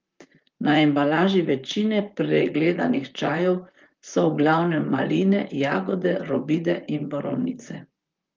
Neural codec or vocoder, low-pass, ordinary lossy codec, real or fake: vocoder, 44.1 kHz, 128 mel bands, Pupu-Vocoder; 7.2 kHz; Opus, 32 kbps; fake